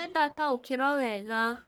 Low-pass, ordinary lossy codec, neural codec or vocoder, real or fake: none; none; codec, 44.1 kHz, 1.7 kbps, Pupu-Codec; fake